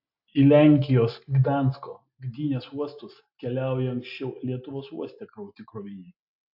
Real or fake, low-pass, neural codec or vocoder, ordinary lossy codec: real; 5.4 kHz; none; AAC, 48 kbps